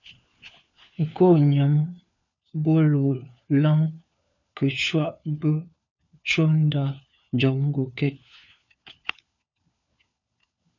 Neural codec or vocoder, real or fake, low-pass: codec, 16 kHz, 4 kbps, FunCodec, trained on LibriTTS, 50 frames a second; fake; 7.2 kHz